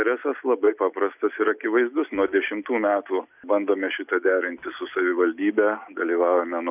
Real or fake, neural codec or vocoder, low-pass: real; none; 3.6 kHz